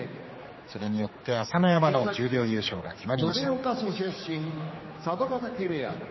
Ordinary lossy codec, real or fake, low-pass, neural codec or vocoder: MP3, 24 kbps; fake; 7.2 kHz; codec, 16 kHz, 4 kbps, X-Codec, HuBERT features, trained on general audio